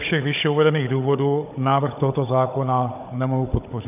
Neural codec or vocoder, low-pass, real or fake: codec, 16 kHz, 16 kbps, FunCodec, trained on Chinese and English, 50 frames a second; 3.6 kHz; fake